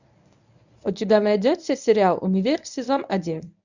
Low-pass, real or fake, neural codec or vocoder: 7.2 kHz; fake; codec, 24 kHz, 0.9 kbps, WavTokenizer, medium speech release version 1